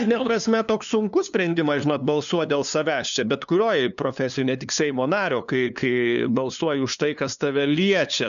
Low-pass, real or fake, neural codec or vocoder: 7.2 kHz; fake; codec, 16 kHz, 2 kbps, FunCodec, trained on LibriTTS, 25 frames a second